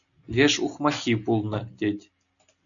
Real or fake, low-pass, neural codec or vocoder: real; 7.2 kHz; none